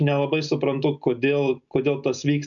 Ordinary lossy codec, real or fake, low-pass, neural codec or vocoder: MP3, 96 kbps; real; 7.2 kHz; none